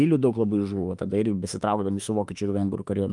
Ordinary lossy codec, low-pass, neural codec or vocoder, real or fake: Opus, 32 kbps; 10.8 kHz; autoencoder, 48 kHz, 32 numbers a frame, DAC-VAE, trained on Japanese speech; fake